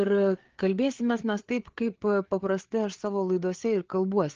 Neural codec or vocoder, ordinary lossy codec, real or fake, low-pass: codec, 16 kHz, 4 kbps, FreqCodec, larger model; Opus, 16 kbps; fake; 7.2 kHz